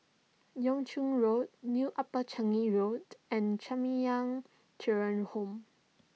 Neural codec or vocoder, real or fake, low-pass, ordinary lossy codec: none; real; none; none